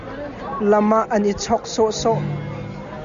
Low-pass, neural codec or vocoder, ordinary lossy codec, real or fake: 7.2 kHz; none; Opus, 64 kbps; real